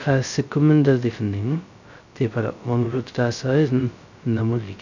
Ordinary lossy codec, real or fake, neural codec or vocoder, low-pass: none; fake; codec, 16 kHz, 0.2 kbps, FocalCodec; 7.2 kHz